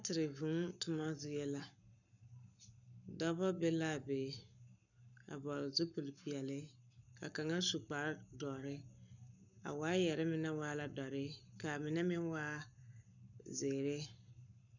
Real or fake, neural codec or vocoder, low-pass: fake; codec, 44.1 kHz, 7.8 kbps, Pupu-Codec; 7.2 kHz